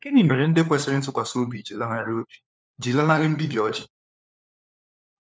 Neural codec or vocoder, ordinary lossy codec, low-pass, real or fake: codec, 16 kHz, 4 kbps, FunCodec, trained on LibriTTS, 50 frames a second; none; none; fake